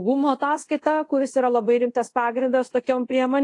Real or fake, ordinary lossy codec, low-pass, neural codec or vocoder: fake; AAC, 48 kbps; 10.8 kHz; codec, 24 kHz, 0.5 kbps, DualCodec